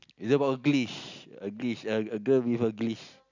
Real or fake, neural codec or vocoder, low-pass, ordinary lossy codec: real; none; 7.2 kHz; none